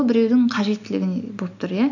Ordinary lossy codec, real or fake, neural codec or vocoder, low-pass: none; real; none; 7.2 kHz